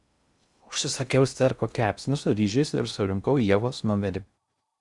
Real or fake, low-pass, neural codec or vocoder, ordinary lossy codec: fake; 10.8 kHz; codec, 16 kHz in and 24 kHz out, 0.6 kbps, FocalCodec, streaming, 2048 codes; Opus, 64 kbps